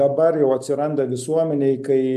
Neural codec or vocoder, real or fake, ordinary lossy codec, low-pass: none; real; AAC, 96 kbps; 14.4 kHz